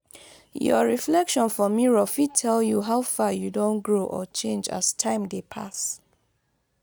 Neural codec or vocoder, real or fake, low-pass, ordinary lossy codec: none; real; none; none